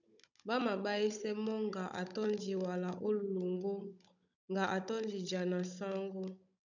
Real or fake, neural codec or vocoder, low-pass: fake; codec, 16 kHz, 16 kbps, FunCodec, trained on Chinese and English, 50 frames a second; 7.2 kHz